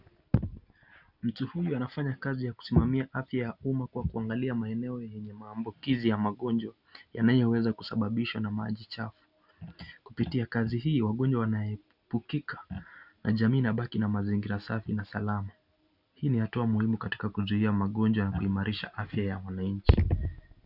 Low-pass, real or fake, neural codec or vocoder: 5.4 kHz; real; none